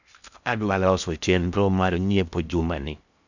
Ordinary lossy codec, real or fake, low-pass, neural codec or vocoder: none; fake; 7.2 kHz; codec, 16 kHz in and 24 kHz out, 0.6 kbps, FocalCodec, streaming, 4096 codes